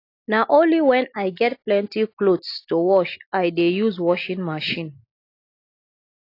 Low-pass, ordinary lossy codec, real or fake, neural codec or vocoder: 5.4 kHz; AAC, 32 kbps; real; none